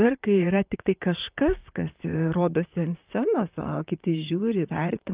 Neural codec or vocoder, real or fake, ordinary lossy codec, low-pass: vocoder, 44.1 kHz, 128 mel bands, Pupu-Vocoder; fake; Opus, 24 kbps; 3.6 kHz